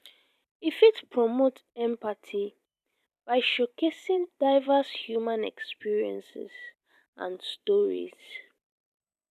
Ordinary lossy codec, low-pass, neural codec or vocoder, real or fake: none; 14.4 kHz; vocoder, 44.1 kHz, 128 mel bands every 512 samples, BigVGAN v2; fake